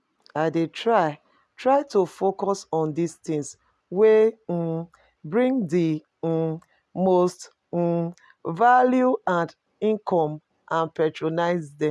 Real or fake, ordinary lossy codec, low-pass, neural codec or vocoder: real; none; none; none